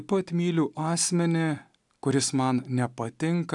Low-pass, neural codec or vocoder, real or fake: 10.8 kHz; none; real